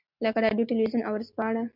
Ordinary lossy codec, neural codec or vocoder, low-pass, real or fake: MP3, 48 kbps; none; 5.4 kHz; real